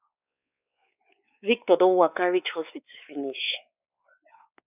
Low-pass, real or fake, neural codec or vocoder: 3.6 kHz; fake; codec, 16 kHz, 4 kbps, X-Codec, WavLM features, trained on Multilingual LibriSpeech